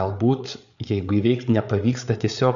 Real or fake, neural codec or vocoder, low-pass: fake; codec, 16 kHz, 8 kbps, FreqCodec, larger model; 7.2 kHz